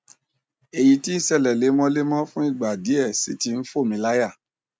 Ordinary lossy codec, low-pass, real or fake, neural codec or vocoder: none; none; real; none